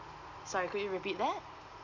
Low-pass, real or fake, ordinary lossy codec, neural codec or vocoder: 7.2 kHz; real; none; none